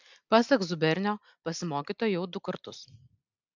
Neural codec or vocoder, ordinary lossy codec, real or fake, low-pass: none; MP3, 64 kbps; real; 7.2 kHz